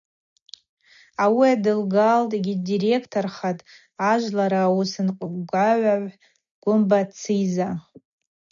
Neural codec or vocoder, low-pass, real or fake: none; 7.2 kHz; real